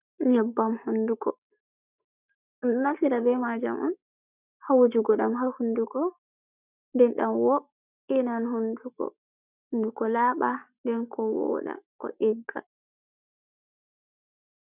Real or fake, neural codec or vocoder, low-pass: fake; codec, 44.1 kHz, 7.8 kbps, Pupu-Codec; 3.6 kHz